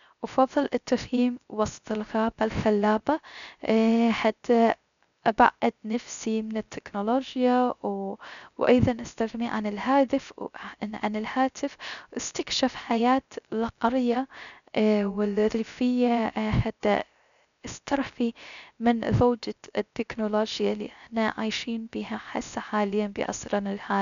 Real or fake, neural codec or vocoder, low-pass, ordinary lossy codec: fake; codec, 16 kHz, 0.3 kbps, FocalCodec; 7.2 kHz; none